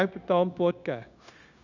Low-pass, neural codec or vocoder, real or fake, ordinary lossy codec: 7.2 kHz; codec, 16 kHz, 0.9 kbps, LongCat-Audio-Codec; fake; none